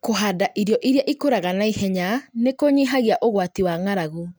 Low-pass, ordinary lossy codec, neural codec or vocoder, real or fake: none; none; none; real